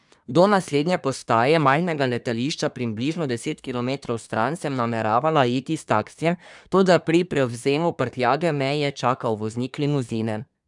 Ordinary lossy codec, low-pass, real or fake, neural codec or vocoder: none; 10.8 kHz; fake; codec, 24 kHz, 1 kbps, SNAC